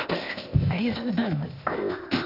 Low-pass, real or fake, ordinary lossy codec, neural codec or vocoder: 5.4 kHz; fake; none; codec, 16 kHz, 0.8 kbps, ZipCodec